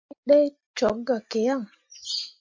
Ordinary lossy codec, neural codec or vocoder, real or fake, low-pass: MP3, 48 kbps; none; real; 7.2 kHz